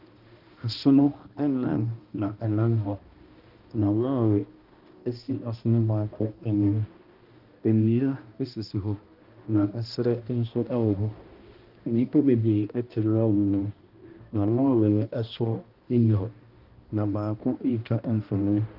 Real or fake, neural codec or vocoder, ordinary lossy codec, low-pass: fake; codec, 16 kHz, 1 kbps, X-Codec, HuBERT features, trained on balanced general audio; Opus, 32 kbps; 5.4 kHz